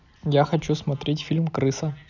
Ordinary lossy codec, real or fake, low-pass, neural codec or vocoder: none; real; 7.2 kHz; none